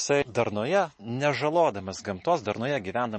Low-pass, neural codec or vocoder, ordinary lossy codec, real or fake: 10.8 kHz; none; MP3, 32 kbps; real